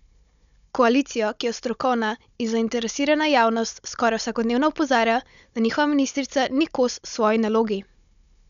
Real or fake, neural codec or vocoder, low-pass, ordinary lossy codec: fake; codec, 16 kHz, 16 kbps, FunCodec, trained on Chinese and English, 50 frames a second; 7.2 kHz; none